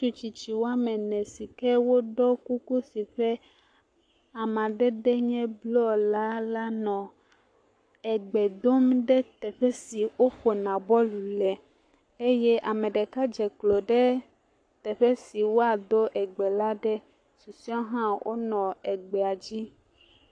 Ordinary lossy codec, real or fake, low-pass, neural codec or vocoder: AAC, 48 kbps; fake; 9.9 kHz; codec, 44.1 kHz, 7.8 kbps, Pupu-Codec